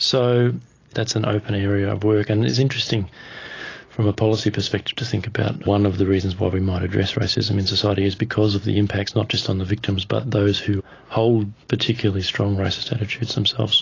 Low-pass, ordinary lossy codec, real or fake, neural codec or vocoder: 7.2 kHz; AAC, 32 kbps; real; none